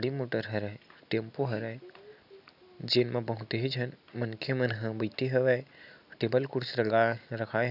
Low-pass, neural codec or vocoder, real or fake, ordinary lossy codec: 5.4 kHz; none; real; none